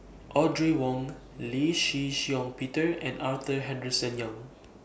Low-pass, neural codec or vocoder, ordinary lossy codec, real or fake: none; none; none; real